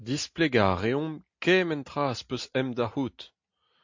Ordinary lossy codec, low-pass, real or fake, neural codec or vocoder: MP3, 48 kbps; 7.2 kHz; real; none